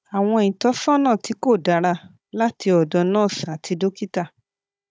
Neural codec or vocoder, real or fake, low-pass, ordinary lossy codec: codec, 16 kHz, 16 kbps, FunCodec, trained on Chinese and English, 50 frames a second; fake; none; none